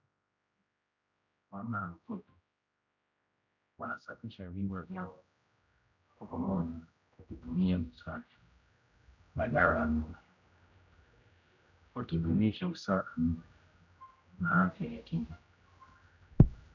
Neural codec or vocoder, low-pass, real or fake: codec, 16 kHz, 0.5 kbps, X-Codec, HuBERT features, trained on general audio; 7.2 kHz; fake